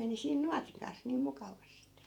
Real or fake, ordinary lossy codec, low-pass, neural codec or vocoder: fake; none; 19.8 kHz; vocoder, 48 kHz, 128 mel bands, Vocos